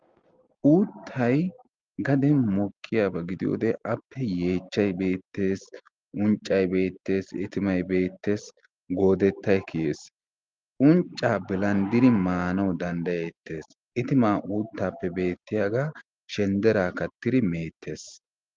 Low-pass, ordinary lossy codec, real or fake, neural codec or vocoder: 7.2 kHz; Opus, 32 kbps; real; none